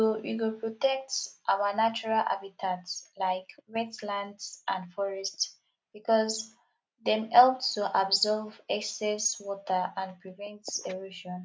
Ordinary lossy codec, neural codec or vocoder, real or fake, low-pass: none; none; real; none